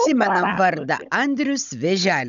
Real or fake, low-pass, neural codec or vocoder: fake; 7.2 kHz; codec, 16 kHz, 16 kbps, FunCodec, trained on Chinese and English, 50 frames a second